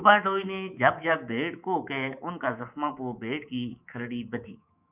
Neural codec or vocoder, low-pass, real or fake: codec, 24 kHz, 3.1 kbps, DualCodec; 3.6 kHz; fake